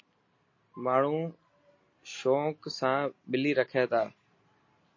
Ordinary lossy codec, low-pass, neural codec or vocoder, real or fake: MP3, 32 kbps; 7.2 kHz; none; real